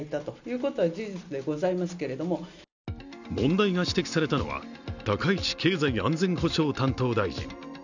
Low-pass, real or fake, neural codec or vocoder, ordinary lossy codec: 7.2 kHz; real; none; none